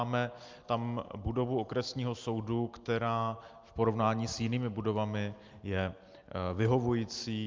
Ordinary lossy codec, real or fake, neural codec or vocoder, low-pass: Opus, 24 kbps; real; none; 7.2 kHz